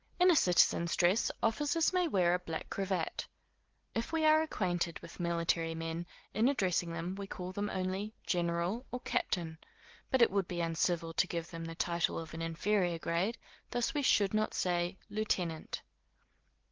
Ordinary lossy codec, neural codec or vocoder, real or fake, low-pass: Opus, 16 kbps; none; real; 7.2 kHz